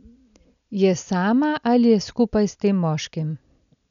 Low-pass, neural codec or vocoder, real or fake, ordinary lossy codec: 7.2 kHz; none; real; none